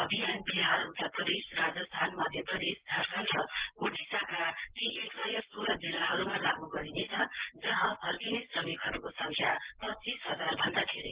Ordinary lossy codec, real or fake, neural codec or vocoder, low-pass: Opus, 16 kbps; real; none; 3.6 kHz